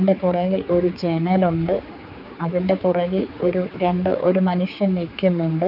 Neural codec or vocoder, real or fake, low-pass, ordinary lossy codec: codec, 16 kHz, 4 kbps, X-Codec, HuBERT features, trained on general audio; fake; 5.4 kHz; none